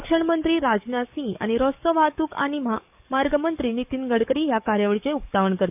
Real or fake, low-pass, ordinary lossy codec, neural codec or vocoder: fake; 3.6 kHz; none; codec, 44.1 kHz, 7.8 kbps, DAC